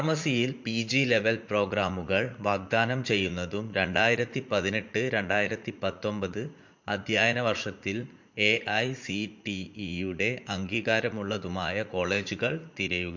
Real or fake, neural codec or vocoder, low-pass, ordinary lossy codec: fake; vocoder, 44.1 kHz, 80 mel bands, Vocos; 7.2 kHz; MP3, 48 kbps